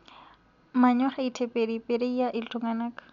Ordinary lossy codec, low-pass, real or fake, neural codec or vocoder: none; 7.2 kHz; real; none